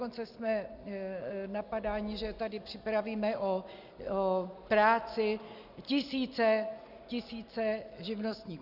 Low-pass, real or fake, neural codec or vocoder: 5.4 kHz; real; none